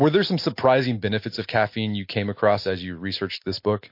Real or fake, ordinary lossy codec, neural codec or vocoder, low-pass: real; MP3, 32 kbps; none; 5.4 kHz